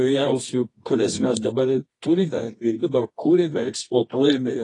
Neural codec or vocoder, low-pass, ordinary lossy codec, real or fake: codec, 24 kHz, 0.9 kbps, WavTokenizer, medium music audio release; 10.8 kHz; AAC, 32 kbps; fake